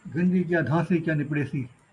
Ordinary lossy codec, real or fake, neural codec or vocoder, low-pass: MP3, 96 kbps; real; none; 10.8 kHz